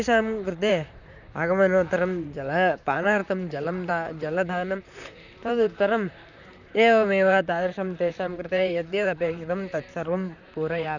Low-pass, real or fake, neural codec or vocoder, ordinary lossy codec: 7.2 kHz; fake; vocoder, 44.1 kHz, 128 mel bands, Pupu-Vocoder; none